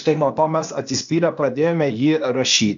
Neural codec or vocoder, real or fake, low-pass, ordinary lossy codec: codec, 16 kHz, 0.8 kbps, ZipCodec; fake; 7.2 kHz; MP3, 64 kbps